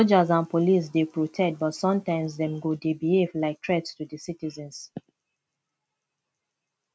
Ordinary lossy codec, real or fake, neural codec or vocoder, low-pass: none; real; none; none